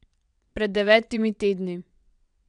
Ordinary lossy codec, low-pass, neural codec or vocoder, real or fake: none; 9.9 kHz; vocoder, 22.05 kHz, 80 mel bands, WaveNeXt; fake